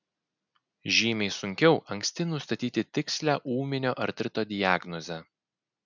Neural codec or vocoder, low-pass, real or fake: none; 7.2 kHz; real